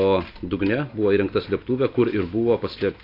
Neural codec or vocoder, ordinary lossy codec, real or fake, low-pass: none; AAC, 32 kbps; real; 5.4 kHz